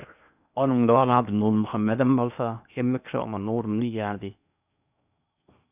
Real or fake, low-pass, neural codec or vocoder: fake; 3.6 kHz; codec, 16 kHz in and 24 kHz out, 0.8 kbps, FocalCodec, streaming, 65536 codes